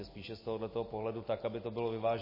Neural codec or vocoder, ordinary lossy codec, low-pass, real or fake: none; MP3, 24 kbps; 5.4 kHz; real